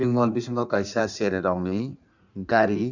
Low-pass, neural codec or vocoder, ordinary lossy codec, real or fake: 7.2 kHz; codec, 16 kHz in and 24 kHz out, 1.1 kbps, FireRedTTS-2 codec; none; fake